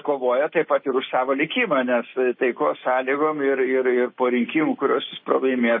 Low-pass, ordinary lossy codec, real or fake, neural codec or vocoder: 7.2 kHz; MP3, 24 kbps; real; none